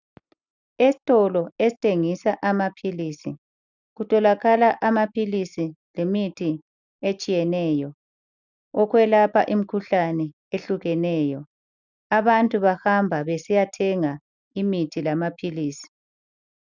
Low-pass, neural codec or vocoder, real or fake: 7.2 kHz; none; real